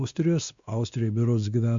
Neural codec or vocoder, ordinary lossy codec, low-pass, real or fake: codec, 16 kHz, 2 kbps, X-Codec, WavLM features, trained on Multilingual LibriSpeech; Opus, 64 kbps; 7.2 kHz; fake